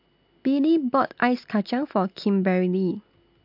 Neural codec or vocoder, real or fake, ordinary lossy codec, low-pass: none; real; MP3, 48 kbps; 5.4 kHz